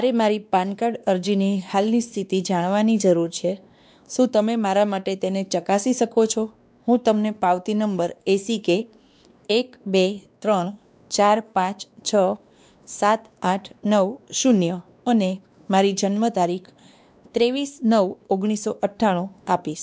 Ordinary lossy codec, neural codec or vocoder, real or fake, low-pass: none; codec, 16 kHz, 2 kbps, X-Codec, WavLM features, trained on Multilingual LibriSpeech; fake; none